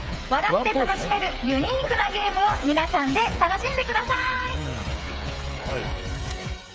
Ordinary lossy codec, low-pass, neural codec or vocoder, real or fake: none; none; codec, 16 kHz, 8 kbps, FreqCodec, smaller model; fake